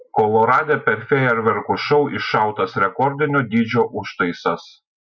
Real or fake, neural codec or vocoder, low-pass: real; none; 7.2 kHz